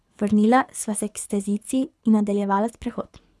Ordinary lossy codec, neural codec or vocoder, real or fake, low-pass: none; codec, 24 kHz, 6 kbps, HILCodec; fake; none